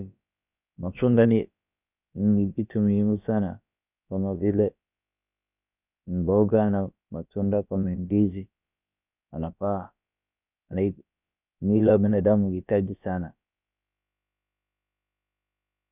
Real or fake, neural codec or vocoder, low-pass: fake; codec, 16 kHz, about 1 kbps, DyCAST, with the encoder's durations; 3.6 kHz